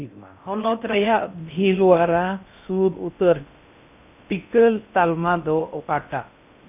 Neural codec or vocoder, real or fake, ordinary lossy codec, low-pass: codec, 16 kHz in and 24 kHz out, 0.6 kbps, FocalCodec, streaming, 4096 codes; fake; none; 3.6 kHz